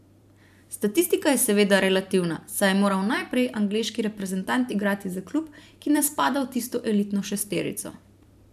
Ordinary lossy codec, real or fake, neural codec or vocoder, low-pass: none; fake; vocoder, 44.1 kHz, 128 mel bands every 512 samples, BigVGAN v2; 14.4 kHz